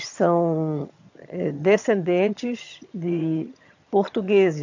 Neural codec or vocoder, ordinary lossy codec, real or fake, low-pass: vocoder, 22.05 kHz, 80 mel bands, HiFi-GAN; AAC, 48 kbps; fake; 7.2 kHz